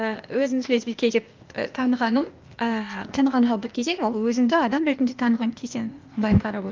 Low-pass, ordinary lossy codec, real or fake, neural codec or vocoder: 7.2 kHz; Opus, 24 kbps; fake; codec, 16 kHz, 0.8 kbps, ZipCodec